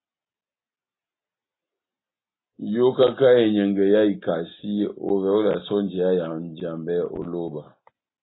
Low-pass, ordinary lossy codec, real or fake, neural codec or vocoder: 7.2 kHz; AAC, 16 kbps; real; none